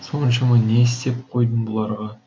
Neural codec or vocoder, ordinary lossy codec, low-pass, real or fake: none; none; none; real